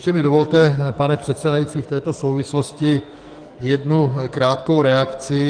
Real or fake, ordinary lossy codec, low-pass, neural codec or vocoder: fake; Opus, 24 kbps; 9.9 kHz; codec, 44.1 kHz, 2.6 kbps, SNAC